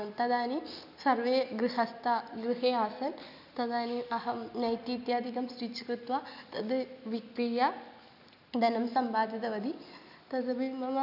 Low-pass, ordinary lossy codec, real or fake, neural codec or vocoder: 5.4 kHz; none; real; none